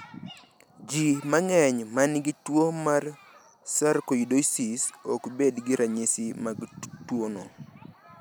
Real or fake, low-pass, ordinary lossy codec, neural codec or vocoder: real; none; none; none